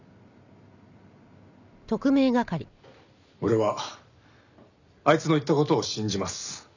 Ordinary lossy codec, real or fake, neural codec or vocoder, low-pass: none; real; none; 7.2 kHz